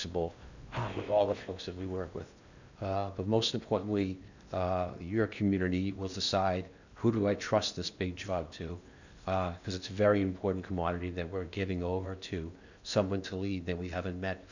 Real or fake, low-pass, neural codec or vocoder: fake; 7.2 kHz; codec, 16 kHz in and 24 kHz out, 0.8 kbps, FocalCodec, streaming, 65536 codes